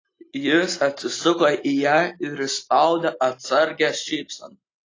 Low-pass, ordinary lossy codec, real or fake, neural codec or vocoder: 7.2 kHz; AAC, 32 kbps; real; none